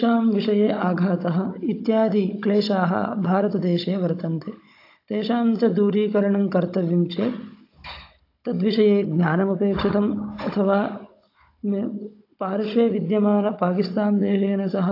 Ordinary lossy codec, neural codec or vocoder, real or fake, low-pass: AAC, 32 kbps; codec, 16 kHz, 16 kbps, FunCodec, trained on Chinese and English, 50 frames a second; fake; 5.4 kHz